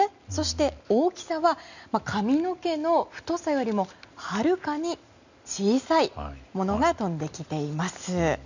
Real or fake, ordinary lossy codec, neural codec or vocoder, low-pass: real; none; none; 7.2 kHz